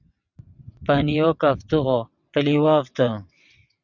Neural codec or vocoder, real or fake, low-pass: vocoder, 22.05 kHz, 80 mel bands, WaveNeXt; fake; 7.2 kHz